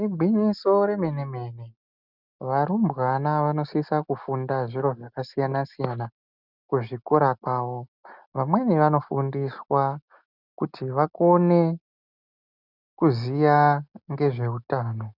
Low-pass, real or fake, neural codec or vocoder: 5.4 kHz; real; none